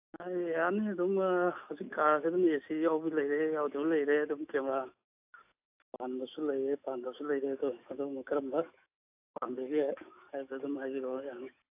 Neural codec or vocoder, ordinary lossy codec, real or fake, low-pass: autoencoder, 48 kHz, 128 numbers a frame, DAC-VAE, trained on Japanese speech; AAC, 32 kbps; fake; 3.6 kHz